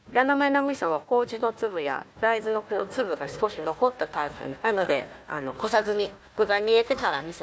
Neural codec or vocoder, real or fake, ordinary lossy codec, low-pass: codec, 16 kHz, 1 kbps, FunCodec, trained on Chinese and English, 50 frames a second; fake; none; none